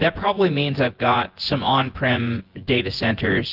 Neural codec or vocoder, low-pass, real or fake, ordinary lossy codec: vocoder, 24 kHz, 100 mel bands, Vocos; 5.4 kHz; fake; Opus, 16 kbps